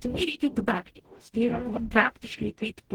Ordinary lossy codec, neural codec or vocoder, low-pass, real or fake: Opus, 16 kbps; codec, 44.1 kHz, 0.9 kbps, DAC; 19.8 kHz; fake